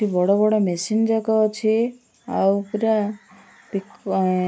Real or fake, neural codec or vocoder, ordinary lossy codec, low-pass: real; none; none; none